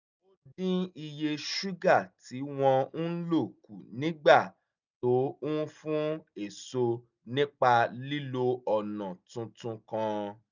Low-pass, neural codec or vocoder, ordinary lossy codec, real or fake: 7.2 kHz; none; none; real